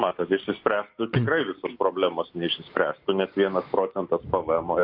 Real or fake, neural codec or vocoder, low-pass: real; none; 5.4 kHz